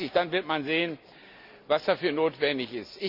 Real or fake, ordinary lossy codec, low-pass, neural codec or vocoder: real; none; 5.4 kHz; none